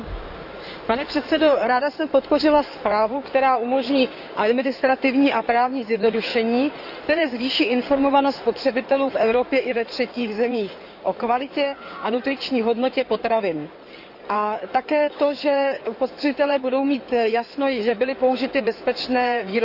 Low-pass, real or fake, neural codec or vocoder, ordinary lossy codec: 5.4 kHz; fake; codec, 16 kHz in and 24 kHz out, 2.2 kbps, FireRedTTS-2 codec; none